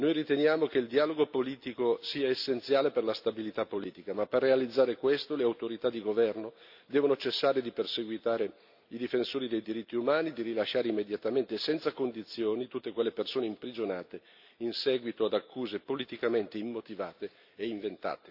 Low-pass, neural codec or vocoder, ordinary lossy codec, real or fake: 5.4 kHz; none; AAC, 48 kbps; real